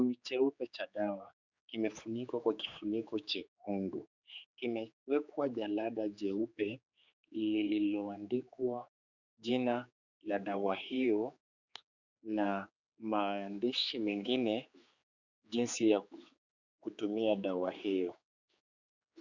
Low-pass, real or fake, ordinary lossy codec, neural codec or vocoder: 7.2 kHz; fake; Opus, 64 kbps; codec, 16 kHz, 4 kbps, X-Codec, HuBERT features, trained on general audio